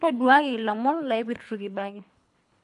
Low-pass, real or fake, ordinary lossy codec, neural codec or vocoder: 10.8 kHz; fake; none; codec, 24 kHz, 3 kbps, HILCodec